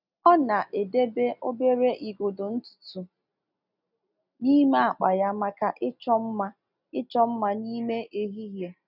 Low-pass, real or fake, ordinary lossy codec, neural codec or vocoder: 5.4 kHz; real; none; none